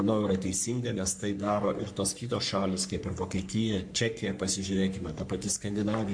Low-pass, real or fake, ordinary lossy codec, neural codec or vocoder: 9.9 kHz; fake; MP3, 64 kbps; codec, 44.1 kHz, 3.4 kbps, Pupu-Codec